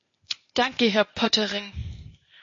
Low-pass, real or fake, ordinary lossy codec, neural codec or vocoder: 7.2 kHz; fake; MP3, 32 kbps; codec, 16 kHz, 0.8 kbps, ZipCodec